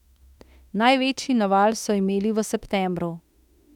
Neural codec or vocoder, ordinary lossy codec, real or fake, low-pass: autoencoder, 48 kHz, 32 numbers a frame, DAC-VAE, trained on Japanese speech; none; fake; 19.8 kHz